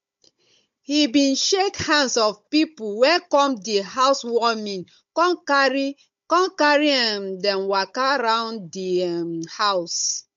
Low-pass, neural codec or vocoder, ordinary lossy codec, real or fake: 7.2 kHz; codec, 16 kHz, 16 kbps, FunCodec, trained on Chinese and English, 50 frames a second; MP3, 48 kbps; fake